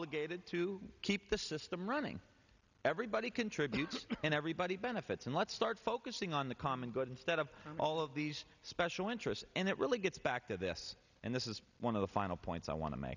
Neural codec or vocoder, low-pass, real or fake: none; 7.2 kHz; real